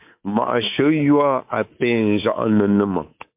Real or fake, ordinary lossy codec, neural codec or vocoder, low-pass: fake; MP3, 32 kbps; codec, 24 kHz, 3.1 kbps, DualCodec; 3.6 kHz